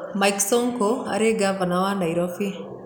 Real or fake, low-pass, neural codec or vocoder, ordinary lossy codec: real; none; none; none